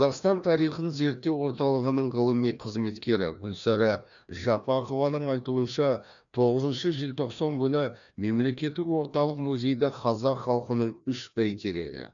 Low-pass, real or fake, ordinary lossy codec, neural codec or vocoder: 7.2 kHz; fake; none; codec, 16 kHz, 1 kbps, FreqCodec, larger model